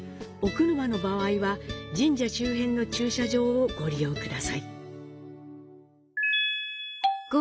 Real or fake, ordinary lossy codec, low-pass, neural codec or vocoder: real; none; none; none